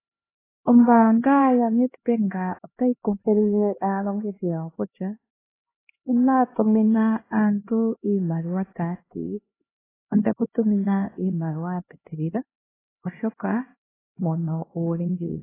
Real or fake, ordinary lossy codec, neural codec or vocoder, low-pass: fake; AAC, 16 kbps; codec, 16 kHz, 1 kbps, X-Codec, HuBERT features, trained on LibriSpeech; 3.6 kHz